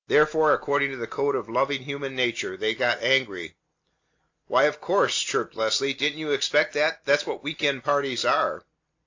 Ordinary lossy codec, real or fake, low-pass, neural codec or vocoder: AAC, 48 kbps; real; 7.2 kHz; none